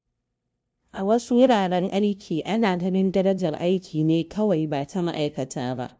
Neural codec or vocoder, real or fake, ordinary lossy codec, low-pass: codec, 16 kHz, 0.5 kbps, FunCodec, trained on LibriTTS, 25 frames a second; fake; none; none